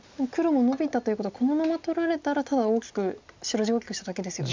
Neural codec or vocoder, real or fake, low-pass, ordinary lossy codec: none; real; 7.2 kHz; MP3, 64 kbps